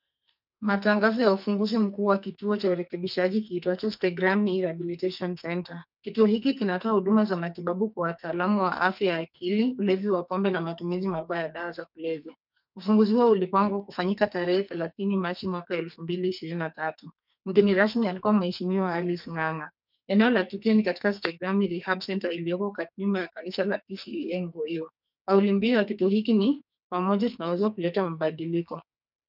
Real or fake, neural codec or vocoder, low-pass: fake; codec, 44.1 kHz, 2.6 kbps, SNAC; 5.4 kHz